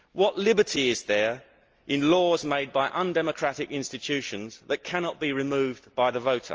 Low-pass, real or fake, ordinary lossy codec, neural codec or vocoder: 7.2 kHz; real; Opus, 24 kbps; none